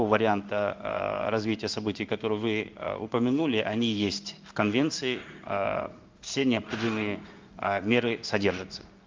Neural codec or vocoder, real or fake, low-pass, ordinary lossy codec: codec, 16 kHz in and 24 kHz out, 1 kbps, XY-Tokenizer; fake; 7.2 kHz; Opus, 24 kbps